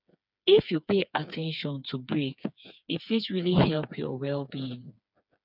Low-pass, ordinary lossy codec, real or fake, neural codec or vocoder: 5.4 kHz; none; fake; codec, 16 kHz, 4 kbps, FreqCodec, smaller model